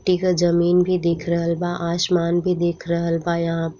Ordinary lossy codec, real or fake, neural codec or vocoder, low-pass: none; real; none; 7.2 kHz